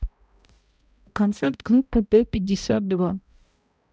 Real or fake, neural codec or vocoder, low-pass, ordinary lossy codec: fake; codec, 16 kHz, 0.5 kbps, X-Codec, HuBERT features, trained on balanced general audio; none; none